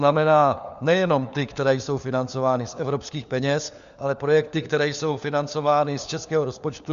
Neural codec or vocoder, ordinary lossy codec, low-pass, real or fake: codec, 16 kHz, 4 kbps, FunCodec, trained on LibriTTS, 50 frames a second; Opus, 64 kbps; 7.2 kHz; fake